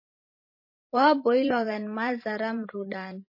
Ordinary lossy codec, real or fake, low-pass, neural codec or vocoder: MP3, 24 kbps; fake; 5.4 kHz; vocoder, 44.1 kHz, 128 mel bands every 512 samples, BigVGAN v2